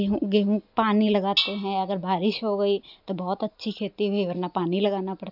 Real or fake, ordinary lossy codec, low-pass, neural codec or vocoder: real; none; 5.4 kHz; none